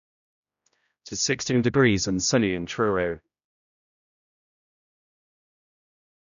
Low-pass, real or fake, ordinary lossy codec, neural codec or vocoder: 7.2 kHz; fake; none; codec, 16 kHz, 0.5 kbps, X-Codec, HuBERT features, trained on general audio